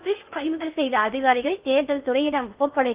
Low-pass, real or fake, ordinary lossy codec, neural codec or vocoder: 3.6 kHz; fake; Opus, 24 kbps; codec, 16 kHz in and 24 kHz out, 0.6 kbps, FocalCodec, streaming, 2048 codes